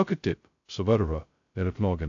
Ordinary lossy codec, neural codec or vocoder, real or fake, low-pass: AAC, 48 kbps; codec, 16 kHz, 0.2 kbps, FocalCodec; fake; 7.2 kHz